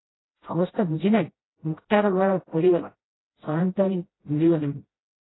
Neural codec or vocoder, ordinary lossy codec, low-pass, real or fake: codec, 16 kHz, 0.5 kbps, FreqCodec, smaller model; AAC, 16 kbps; 7.2 kHz; fake